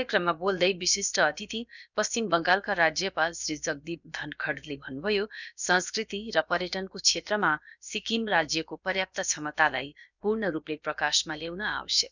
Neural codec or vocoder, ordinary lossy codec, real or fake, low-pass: codec, 16 kHz, about 1 kbps, DyCAST, with the encoder's durations; none; fake; 7.2 kHz